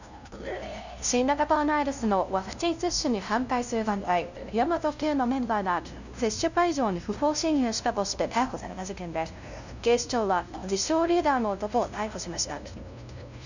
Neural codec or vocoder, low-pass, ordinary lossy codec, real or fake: codec, 16 kHz, 0.5 kbps, FunCodec, trained on LibriTTS, 25 frames a second; 7.2 kHz; none; fake